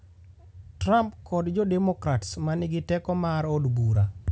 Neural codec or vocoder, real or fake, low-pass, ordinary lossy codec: none; real; none; none